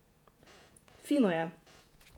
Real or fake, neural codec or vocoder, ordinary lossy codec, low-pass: fake; vocoder, 48 kHz, 128 mel bands, Vocos; none; 19.8 kHz